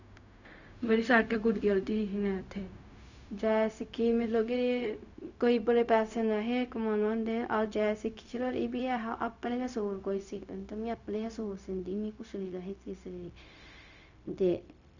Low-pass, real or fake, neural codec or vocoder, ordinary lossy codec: 7.2 kHz; fake; codec, 16 kHz, 0.4 kbps, LongCat-Audio-Codec; none